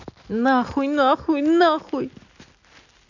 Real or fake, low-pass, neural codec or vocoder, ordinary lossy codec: real; 7.2 kHz; none; none